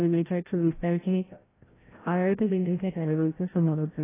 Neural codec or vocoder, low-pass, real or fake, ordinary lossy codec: codec, 16 kHz, 0.5 kbps, FreqCodec, larger model; 3.6 kHz; fake; AAC, 16 kbps